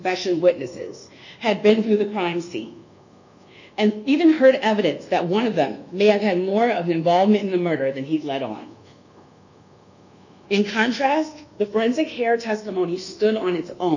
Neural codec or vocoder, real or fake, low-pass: codec, 24 kHz, 1.2 kbps, DualCodec; fake; 7.2 kHz